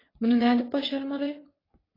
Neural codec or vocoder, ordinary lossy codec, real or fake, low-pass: vocoder, 22.05 kHz, 80 mel bands, WaveNeXt; AAC, 24 kbps; fake; 5.4 kHz